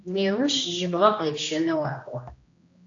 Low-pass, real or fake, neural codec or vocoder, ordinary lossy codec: 7.2 kHz; fake; codec, 16 kHz, 1 kbps, X-Codec, HuBERT features, trained on general audio; AAC, 48 kbps